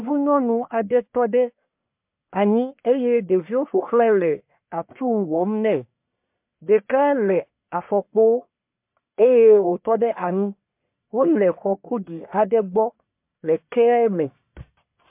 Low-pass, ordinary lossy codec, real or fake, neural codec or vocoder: 3.6 kHz; MP3, 32 kbps; fake; codec, 44.1 kHz, 1.7 kbps, Pupu-Codec